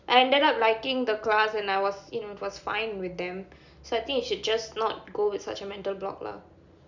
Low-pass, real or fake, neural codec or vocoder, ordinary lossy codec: 7.2 kHz; real; none; none